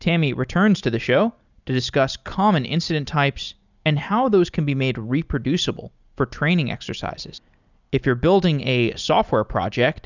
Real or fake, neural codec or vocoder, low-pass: real; none; 7.2 kHz